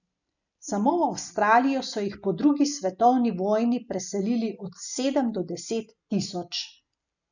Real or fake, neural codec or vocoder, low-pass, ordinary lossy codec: real; none; 7.2 kHz; none